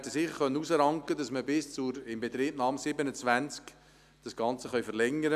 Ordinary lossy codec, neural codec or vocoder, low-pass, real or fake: none; none; 14.4 kHz; real